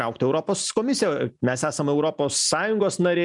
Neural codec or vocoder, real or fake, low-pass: none; real; 10.8 kHz